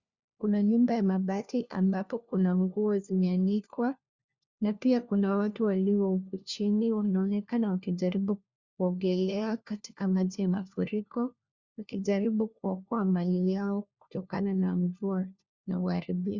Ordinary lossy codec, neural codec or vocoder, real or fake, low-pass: Opus, 64 kbps; codec, 16 kHz, 1 kbps, FunCodec, trained on LibriTTS, 50 frames a second; fake; 7.2 kHz